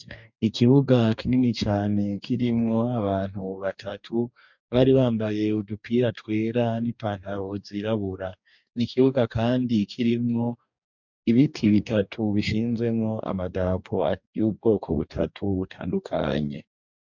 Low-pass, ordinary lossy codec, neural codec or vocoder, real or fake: 7.2 kHz; MP3, 64 kbps; codec, 44.1 kHz, 2.6 kbps, DAC; fake